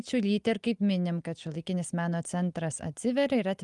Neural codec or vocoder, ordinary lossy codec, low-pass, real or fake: none; Opus, 32 kbps; 10.8 kHz; real